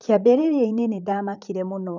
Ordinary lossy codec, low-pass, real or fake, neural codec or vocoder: none; 7.2 kHz; fake; vocoder, 44.1 kHz, 128 mel bands, Pupu-Vocoder